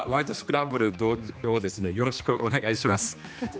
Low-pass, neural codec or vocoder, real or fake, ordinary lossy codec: none; codec, 16 kHz, 2 kbps, X-Codec, HuBERT features, trained on general audio; fake; none